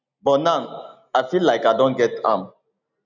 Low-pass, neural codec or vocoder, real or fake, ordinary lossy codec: 7.2 kHz; none; real; none